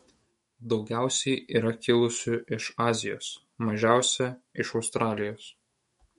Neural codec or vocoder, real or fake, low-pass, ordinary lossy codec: autoencoder, 48 kHz, 128 numbers a frame, DAC-VAE, trained on Japanese speech; fake; 19.8 kHz; MP3, 48 kbps